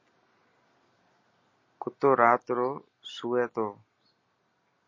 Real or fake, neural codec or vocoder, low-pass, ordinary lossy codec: real; none; 7.2 kHz; MP3, 32 kbps